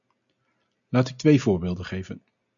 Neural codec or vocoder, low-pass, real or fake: none; 7.2 kHz; real